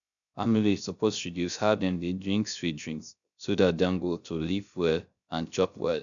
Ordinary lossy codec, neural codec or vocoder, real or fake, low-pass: none; codec, 16 kHz, 0.3 kbps, FocalCodec; fake; 7.2 kHz